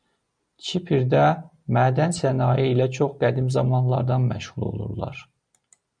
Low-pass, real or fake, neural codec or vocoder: 9.9 kHz; real; none